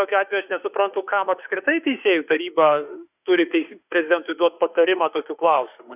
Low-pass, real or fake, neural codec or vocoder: 3.6 kHz; fake; autoencoder, 48 kHz, 32 numbers a frame, DAC-VAE, trained on Japanese speech